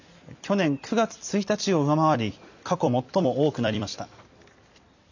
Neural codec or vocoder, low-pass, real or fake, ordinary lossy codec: vocoder, 44.1 kHz, 128 mel bands every 256 samples, BigVGAN v2; 7.2 kHz; fake; none